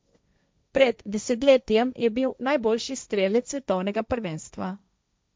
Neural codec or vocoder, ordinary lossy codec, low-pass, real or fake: codec, 16 kHz, 1.1 kbps, Voila-Tokenizer; none; none; fake